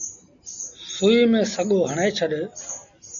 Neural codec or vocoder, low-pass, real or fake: none; 7.2 kHz; real